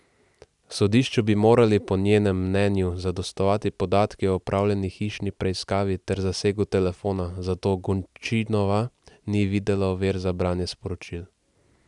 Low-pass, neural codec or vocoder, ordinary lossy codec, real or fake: 10.8 kHz; none; none; real